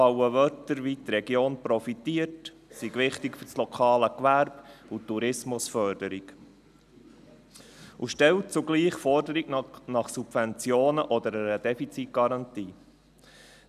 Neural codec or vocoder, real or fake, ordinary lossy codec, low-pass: none; real; none; 14.4 kHz